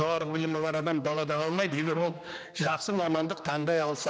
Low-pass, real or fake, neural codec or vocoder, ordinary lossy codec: none; fake; codec, 16 kHz, 2 kbps, X-Codec, HuBERT features, trained on general audio; none